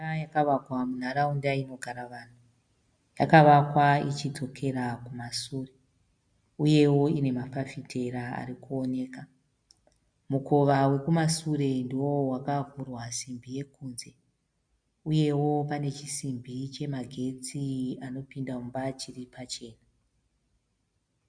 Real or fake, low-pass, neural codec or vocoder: real; 9.9 kHz; none